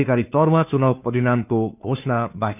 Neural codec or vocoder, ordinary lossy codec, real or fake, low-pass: codec, 16 kHz, 2 kbps, FunCodec, trained on Chinese and English, 25 frames a second; none; fake; 3.6 kHz